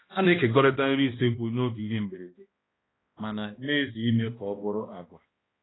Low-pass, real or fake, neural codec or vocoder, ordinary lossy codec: 7.2 kHz; fake; codec, 16 kHz, 1 kbps, X-Codec, HuBERT features, trained on balanced general audio; AAC, 16 kbps